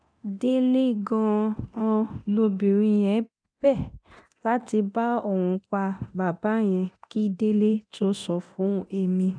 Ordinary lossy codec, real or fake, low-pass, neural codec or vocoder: none; fake; 9.9 kHz; codec, 24 kHz, 0.9 kbps, DualCodec